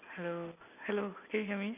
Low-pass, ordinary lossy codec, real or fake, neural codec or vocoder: 3.6 kHz; none; real; none